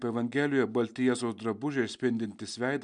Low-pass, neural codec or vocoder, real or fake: 9.9 kHz; none; real